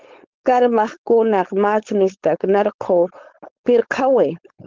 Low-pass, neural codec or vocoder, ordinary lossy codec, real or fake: 7.2 kHz; codec, 16 kHz, 4.8 kbps, FACodec; Opus, 16 kbps; fake